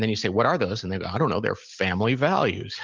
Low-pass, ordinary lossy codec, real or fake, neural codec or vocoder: 7.2 kHz; Opus, 32 kbps; real; none